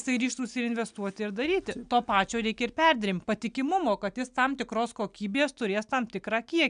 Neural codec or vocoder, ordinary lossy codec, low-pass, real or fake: none; MP3, 96 kbps; 9.9 kHz; real